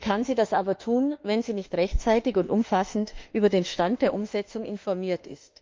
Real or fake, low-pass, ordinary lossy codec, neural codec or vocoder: fake; 7.2 kHz; Opus, 32 kbps; autoencoder, 48 kHz, 32 numbers a frame, DAC-VAE, trained on Japanese speech